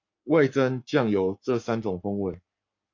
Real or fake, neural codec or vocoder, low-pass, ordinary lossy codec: fake; codec, 44.1 kHz, 7.8 kbps, Pupu-Codec; 7.2 kHz; MP3, 48 kbps